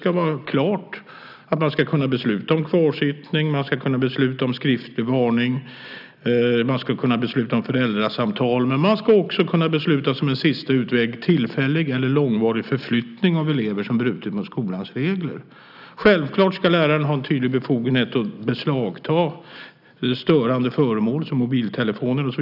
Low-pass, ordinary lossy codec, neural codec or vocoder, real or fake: 5.4 kHz; none; none; real